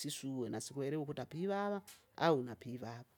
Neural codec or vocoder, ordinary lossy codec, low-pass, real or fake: none; none; 19.8 kHz; real